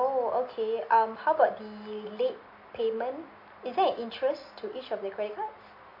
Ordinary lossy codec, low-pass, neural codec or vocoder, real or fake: MP3, 32 kbps; 5.4 kHz; none; real